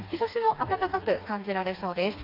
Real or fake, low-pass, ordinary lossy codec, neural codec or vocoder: fake; 5.4 kHz; AAC, 32 kbps; codec, 16 kHz, 2 kbps, FreqCodec, smaller model